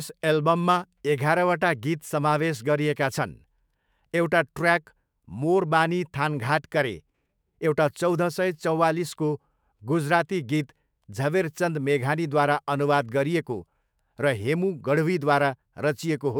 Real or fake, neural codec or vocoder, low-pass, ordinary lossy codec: fake; autoencoder, 48 kHz, 128 numbers a frame, DAC-VAE, trained on Japanese speech; none; none